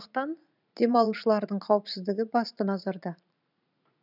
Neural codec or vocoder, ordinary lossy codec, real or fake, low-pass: vocoder, 44.1 kHz, 80 mel bands, Vocos; none; fake; 5.4 kHz